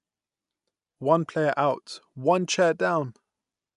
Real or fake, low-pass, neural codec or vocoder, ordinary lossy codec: real; 10.8 kHz; none; none